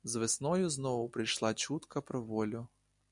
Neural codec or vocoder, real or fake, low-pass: none; real; 10.8 kHz